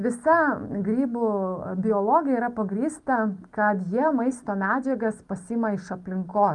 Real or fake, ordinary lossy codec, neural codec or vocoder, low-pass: real; Opus, 32 kbps; none; 10.8 kHz